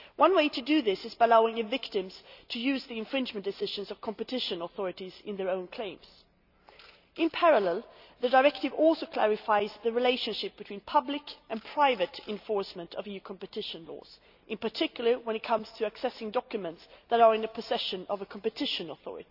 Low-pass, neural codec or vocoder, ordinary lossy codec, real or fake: 5.4 kHz; none; none; real